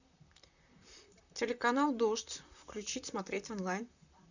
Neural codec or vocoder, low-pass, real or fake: vocoder, 44.1 kHz, 128 mel bands, Pupu-Vocoder; 7.2 kHz; fake